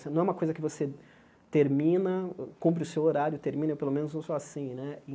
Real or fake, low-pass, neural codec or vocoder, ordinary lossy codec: real; none; none; none